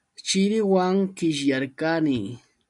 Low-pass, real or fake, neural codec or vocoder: 10.8 kHz; real; none